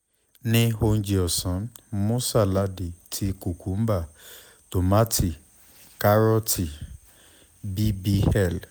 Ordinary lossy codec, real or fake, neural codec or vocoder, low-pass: none; real; none; none